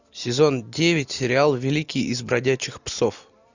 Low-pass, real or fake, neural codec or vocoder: 7.2 kHz; real; none